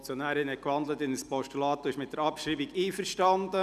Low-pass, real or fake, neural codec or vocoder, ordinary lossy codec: 14.4 kHz; real; none; none